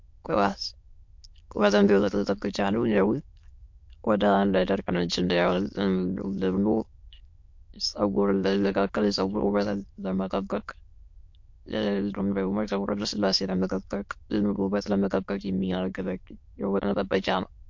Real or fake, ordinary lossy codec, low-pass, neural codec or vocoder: fake; MP3, 48 kbps; 7.2 kHz; autoencoder, 22.05 kHz, a latent of 192 numbers a frame, VITS, trained on many speakers